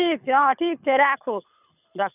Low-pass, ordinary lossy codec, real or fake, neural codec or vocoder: 3.6 kHz; none; fake; codec, 24 kHz, 6 kbps, HILCodec